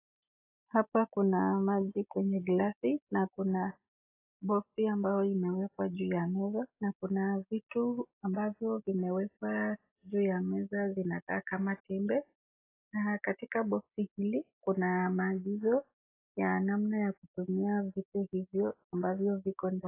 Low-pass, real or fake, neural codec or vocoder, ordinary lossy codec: 3.6 kHz; real; none; AAC, 24 kbps